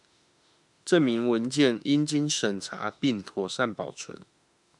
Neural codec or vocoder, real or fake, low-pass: autoencoder, 48 kHz, 32 numbers a frame, DAC-VAE, trained on Japanese speech; fake; 10.8 kHz